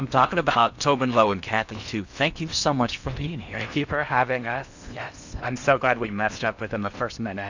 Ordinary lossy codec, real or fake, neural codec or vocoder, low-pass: Opus, 64 kbps; fake; codec, 16 kHz in and 24 kHz out, 0.8 kbps, FocalCodec, streaming, 65536 codes; 7.2 kHz